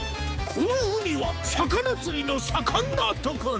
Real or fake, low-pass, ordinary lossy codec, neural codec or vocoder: fake; none; none; codec, 16 kHz, 4 kbps, X-Codec, HuBERT features, trained on balanced general audio